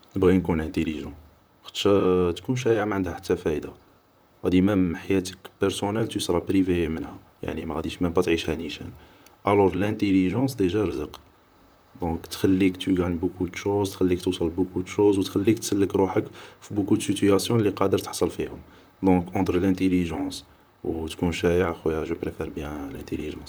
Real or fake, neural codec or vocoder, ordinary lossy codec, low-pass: fake; vocoder, 44.1 kHz, 128 mel bands, Pupu-Vocoder; none; none